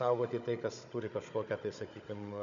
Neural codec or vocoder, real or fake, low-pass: codec, 16 kHz, 16 kbps, FunCodec, trained on Chinese and English, 50 frames a second; fake; 7.2 kHz